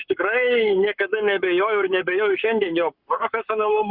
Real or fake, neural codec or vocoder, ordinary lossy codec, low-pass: fake; codec, 44.1 kHz, 7.8 kbps, Pupu-Codec; Opus, 64 kbps; 5.4 kHz